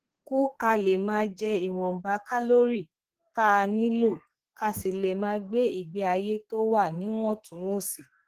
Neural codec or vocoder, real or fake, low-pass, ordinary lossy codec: codec, 32 kHz, 1.9 kbps, SNAC; fake; 14.4 kHz; Opus, 16 kbps